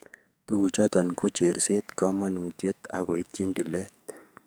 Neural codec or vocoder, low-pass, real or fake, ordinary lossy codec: codec, 44.1 kHz, 2.6 kbps, SNAC; none; fake; none